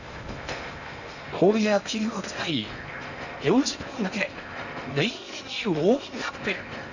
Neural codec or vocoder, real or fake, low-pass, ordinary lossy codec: codec, 16 kHz in and 24 kHz out, 0.6 kbps, FocalCodec, streaming, 2048 codes; fake; 7.2 kHz; none